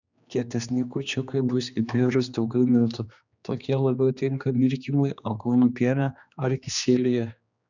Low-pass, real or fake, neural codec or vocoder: 7.2 kHz; fake; codec, 16 kHz, 2 kbps, X-Codec, HuBERT features, trained on general audio